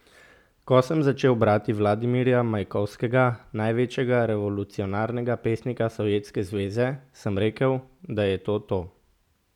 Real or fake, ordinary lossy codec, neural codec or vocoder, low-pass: real; none; none; 19.8 kHz